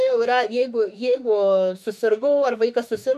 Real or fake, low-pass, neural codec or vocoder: fake; 14.4 kHz; autoencoder, 48 kHz, 32 numbers a frame, DAC-VAE, trained on Japanese speech